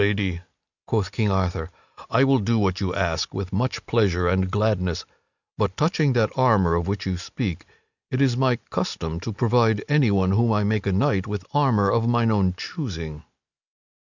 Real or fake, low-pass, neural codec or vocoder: real; 7.2 kHz; none